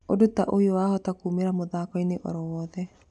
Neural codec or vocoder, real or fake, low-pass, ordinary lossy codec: none; real; 10.8 kHz; none